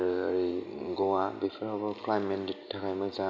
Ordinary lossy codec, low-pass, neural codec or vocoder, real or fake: none; none; none; real